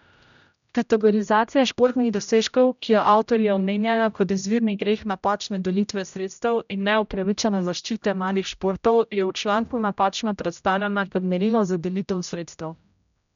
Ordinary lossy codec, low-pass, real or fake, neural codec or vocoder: none; 7.2 kHz; fake; codec, 16 kHz, 0.5 kbps, X-Codec, HuBERT features, trained on general audio